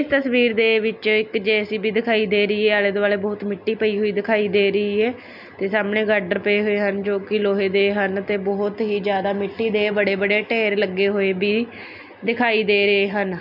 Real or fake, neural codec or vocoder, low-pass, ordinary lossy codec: real; none; 5.4 kHz; none